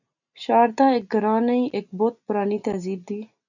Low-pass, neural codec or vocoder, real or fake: 7.2 kHz; none; real